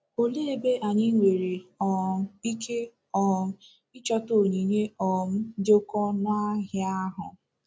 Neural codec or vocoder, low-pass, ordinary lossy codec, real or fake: none; none; none; real